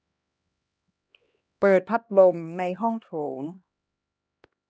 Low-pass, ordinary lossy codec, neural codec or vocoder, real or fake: none; none; codec, 16 kHz, 1 kbps, X-Codec, HuBERT features, trained on LibriSpeech; fake